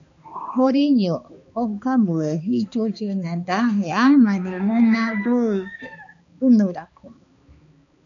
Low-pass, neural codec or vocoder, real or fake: 7.2 kHz; codec, 16 kHz, 2 kbps, X-Codec, HuBERT features, trained on balanced general audio; fake